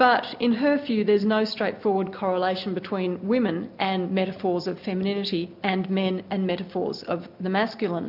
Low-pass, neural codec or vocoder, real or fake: 5.4 kHz; none; real